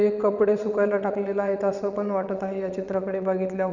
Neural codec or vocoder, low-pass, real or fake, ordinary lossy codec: vocoder, 22.05 kHz, 80 mel bands, WaveNeXt; 7.2 kHz; fake; none